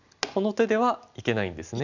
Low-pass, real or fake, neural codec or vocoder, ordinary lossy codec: 7.2 kHz; real; none; none